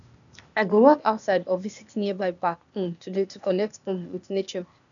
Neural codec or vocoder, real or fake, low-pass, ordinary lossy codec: codec, 16 kHz, 0.8 kbps, ZipCodec; fake; 7.2 kHz; none